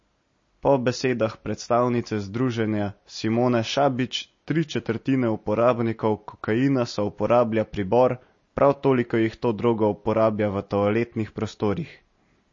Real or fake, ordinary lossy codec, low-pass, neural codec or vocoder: real; MP3, 32 kbps; 7.2 kHz; none